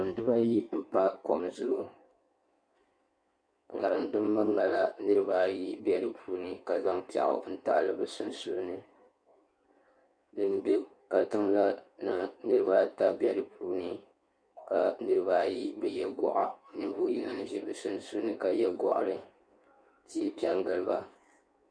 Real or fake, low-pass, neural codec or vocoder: fake; 9.9 kHz; codec, 16 kHz in and 24 kHz out, 1.1 kbps, FireRedTTS-2 codec